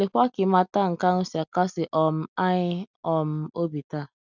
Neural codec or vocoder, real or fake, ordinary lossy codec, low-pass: none; real; none; 7.2 kHz